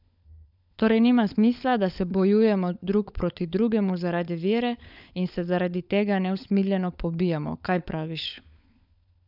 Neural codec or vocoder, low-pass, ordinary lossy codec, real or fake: codec, 16 kHz, 16 kbps, FunCodec, trained on LibriTTS, 50 frames a second; 5.4 kHz; none; fake